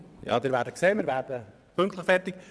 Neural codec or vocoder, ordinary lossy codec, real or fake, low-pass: vocoder, 22.05 kHz, 80 mel bands, WaveNeXt; none; fake; none